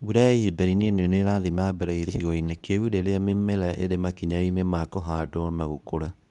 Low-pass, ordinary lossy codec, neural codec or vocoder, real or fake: 10.8 kHz; none; codec, 24 kHz, 0.9 kbps, WavTokenizer, medium speech release version 2; fake